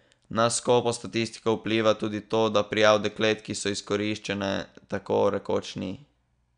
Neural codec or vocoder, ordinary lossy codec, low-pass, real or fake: none; none; 9.9 kHz; real